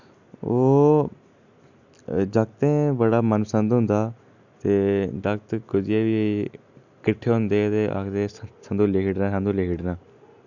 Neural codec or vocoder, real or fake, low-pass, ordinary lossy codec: none; real; 7.2 kHz; none